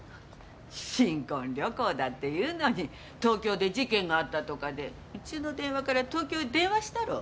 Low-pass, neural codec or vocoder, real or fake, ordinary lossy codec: none; none; real; none